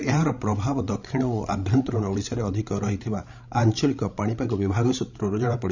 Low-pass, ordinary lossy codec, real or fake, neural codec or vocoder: 7.2 kHz; none; fake; codec, 16 kHz, 16 kbps, FreqCodec, larger model